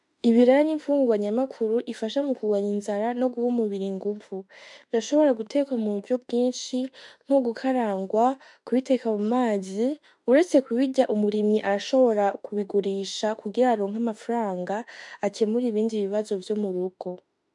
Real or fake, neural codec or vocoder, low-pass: fake; autoencoder, 48 kHz, 32 numbers a frame, DAC-VAE, trained on Japanese speech; 10.8 kHz